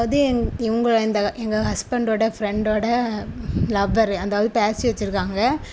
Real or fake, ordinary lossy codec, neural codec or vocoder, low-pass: real; none; none; none